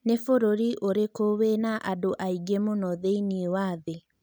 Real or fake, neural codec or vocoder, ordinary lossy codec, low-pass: real; none; none; none